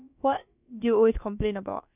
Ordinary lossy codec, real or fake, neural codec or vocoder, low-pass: none; fake; codec, 16 kHz, about 1 kbps, DyCAST, with the encoder's durations; 3.6 kHz